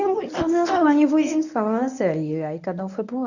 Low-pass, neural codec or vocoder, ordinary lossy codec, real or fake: 7.2 kHz; codec, 24 kHz, 0.9 kbps, WavTokenizer, medium speech release version 1; none; fake